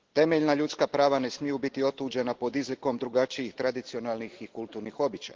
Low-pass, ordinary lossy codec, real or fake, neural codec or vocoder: 7.2 kHz; Opus, 16 kbps; real; none